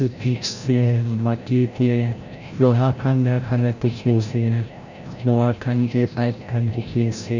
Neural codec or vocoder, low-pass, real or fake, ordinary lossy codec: codec, 16 kHz, 0.5 kbps, FreqCodec, larger model; 7.2 kHz; fake; none